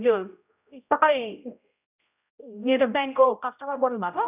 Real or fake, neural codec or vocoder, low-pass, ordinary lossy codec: fake; codec, 16 kHz, 0.5 kbps, X-Codec, HuBERT features, trained on general audio; 3.6 kHz; none